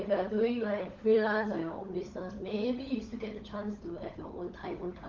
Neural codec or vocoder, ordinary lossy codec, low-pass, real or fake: codec, 16 kHz, 16 kbps, FunCodec, trained on LibriTTS, 50 frames a second; Opus, 32 kbps; 7.2 kHz; fake